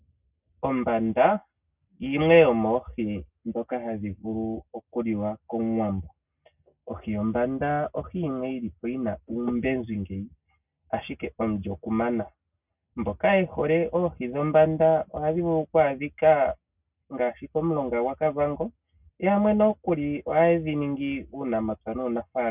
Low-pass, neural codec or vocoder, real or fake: 3.6 kHz; none; real